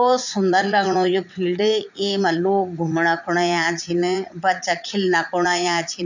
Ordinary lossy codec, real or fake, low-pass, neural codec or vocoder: none; fake; 7.2 kHz; vocoder, 44.1 kHz, 80 mel bands, Vocos